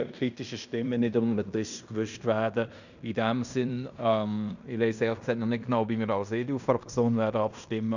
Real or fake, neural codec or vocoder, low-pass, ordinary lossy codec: fake; codec, 16 kHz in and 24 kHz out, 0.9 kbps, LongCat-Audio-Codec, fine tuned four codebook decoder; 7.2 kHz; none